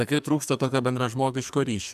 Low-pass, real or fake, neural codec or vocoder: 14.4 kHz; fake; codec, 44.1 kHz, 2.6 kbps, SNAC